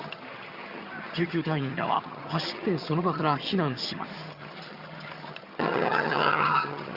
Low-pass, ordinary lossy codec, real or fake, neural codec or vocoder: 5.4 kHz; Opus, 64 kbps; fake; vocoder, 22.05 kHz, 80 mel bands, HiFi-GAN